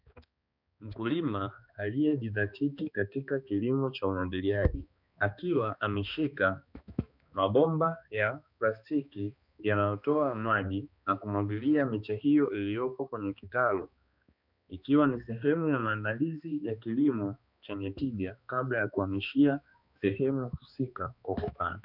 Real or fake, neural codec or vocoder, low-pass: fake; codec, 16 kHz, 2 kbps, X-Codec, HuBERT features, trained on balanced general audio; 5.4 kHz